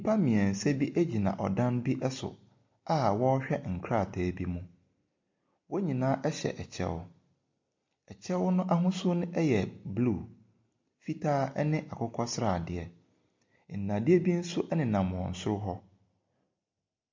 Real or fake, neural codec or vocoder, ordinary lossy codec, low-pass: real; none; MP3, 48 kbps; 7.2 kHz